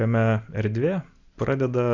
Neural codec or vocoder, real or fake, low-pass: none; real; 7.2 kHz